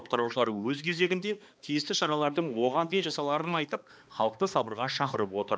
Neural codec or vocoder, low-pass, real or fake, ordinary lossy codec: codec, 16 kHz, 2 kbps, X-Codec, HuBERT features, trained on balanced general audio; none; fake; none